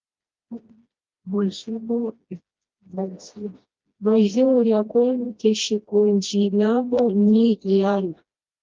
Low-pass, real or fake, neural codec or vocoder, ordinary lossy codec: 7.2 kHz; fake; codec, 16 kHz, 1 kbps, FreqCodec, smaller model; Opus, 32 kbps